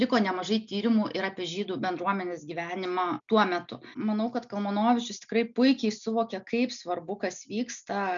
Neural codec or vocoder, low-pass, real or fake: none; 7.2 kHz; real